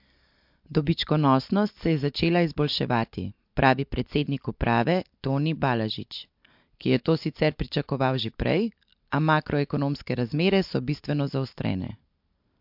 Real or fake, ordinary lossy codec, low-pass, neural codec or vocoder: real; MP3, 48 kbps; 5.4 kHz; none